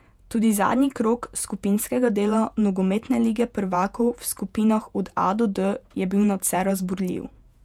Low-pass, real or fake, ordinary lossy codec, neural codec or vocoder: 19.8 kHz; fake; none; vocoder, 48 kHz, 128 mel bands, Vocos